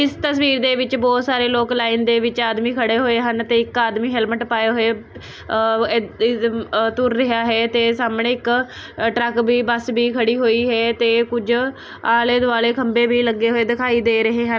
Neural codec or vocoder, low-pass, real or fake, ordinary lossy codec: none; none; real; none